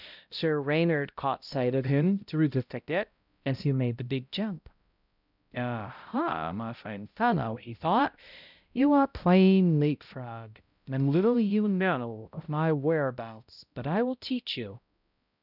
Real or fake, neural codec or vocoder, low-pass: fake; codec, 16 kHz, 0.5 kbps, X-Codec, HuBERT features, trained on balanced general audio; 5.4 kHz